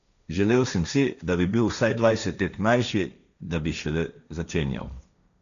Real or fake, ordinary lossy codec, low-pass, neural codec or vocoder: fake; none; 7.2 kHz; codec, 16 kHz, 1.1 kbps, Voila-Tokenizer